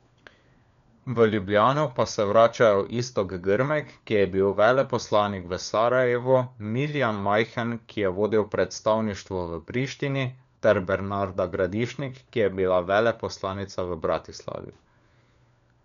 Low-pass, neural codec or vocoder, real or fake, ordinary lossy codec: 7.2 kHz; codec, 16 kHz, 4 kbps, FunCodec, trained on LibriTTS, 50 frames a second; fake; none